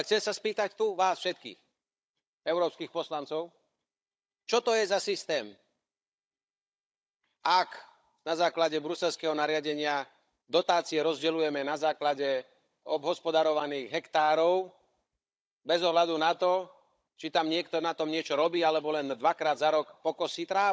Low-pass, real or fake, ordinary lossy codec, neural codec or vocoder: none; fake; none; codec, 16 kHz, 16 kbps, FunCodec, trained on Chinese and English, 50 frames a second